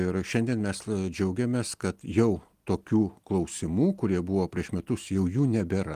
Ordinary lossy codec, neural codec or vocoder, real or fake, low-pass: Opus, 24 kbps; none; real; 14.4 kHz